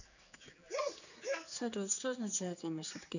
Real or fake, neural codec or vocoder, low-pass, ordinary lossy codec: fake; codec, 16 kHz, 4 kbps, X-Codec, HuBERT features, trained on general audio; 7.2 kHz; none